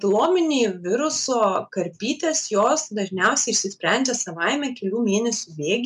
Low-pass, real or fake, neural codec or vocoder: 10.8 kHz; real; none